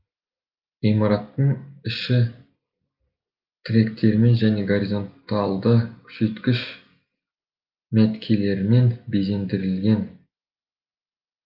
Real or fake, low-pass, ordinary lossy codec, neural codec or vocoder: real; 5.4 kHz; Opus, 24 kbps; none